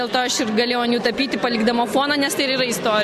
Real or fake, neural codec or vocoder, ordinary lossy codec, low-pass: real; none; AAC, 96 kbps; 14.4 kHz